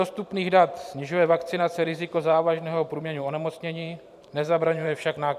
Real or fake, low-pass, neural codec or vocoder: fake; 14.4 kHz; vocoder, 44.1 kHz, 128 mel bands every 512 samples, BigVGAN v2